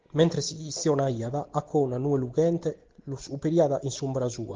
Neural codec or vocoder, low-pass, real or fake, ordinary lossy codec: none; 7.2 kHz; real; Opus, 16 kbps